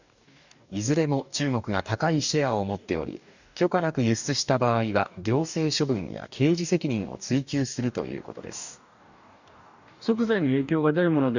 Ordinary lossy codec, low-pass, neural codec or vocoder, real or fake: none; 7.2 kHz; codec, 44.1 kHz, 2.6 kbps, DAC; fake